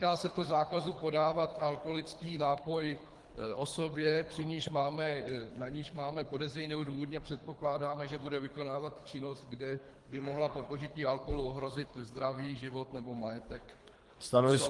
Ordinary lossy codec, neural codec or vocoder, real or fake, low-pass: Opus, 24 kbps; codec, 24 kHz, 3 kbps, HILCodec; fake; 10.8 kHz